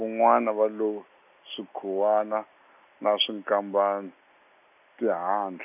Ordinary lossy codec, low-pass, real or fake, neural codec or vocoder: none; 3.6 kHz; real; none